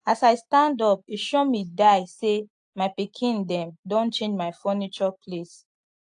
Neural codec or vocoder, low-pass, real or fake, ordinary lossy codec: none; 9.9 kHz; real; AAC, 64 kbps